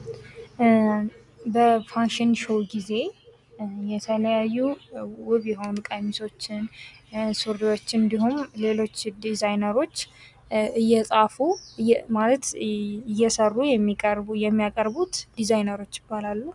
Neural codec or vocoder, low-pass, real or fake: none; 10.8 kHz; real